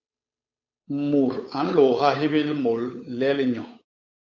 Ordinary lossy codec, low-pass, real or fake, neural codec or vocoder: AAC, 48 kbps; 7.2 kHz; fake; codec, 16 kHz, 8 kbps, FunCodec, trained on Chinese and English, 25 frames a second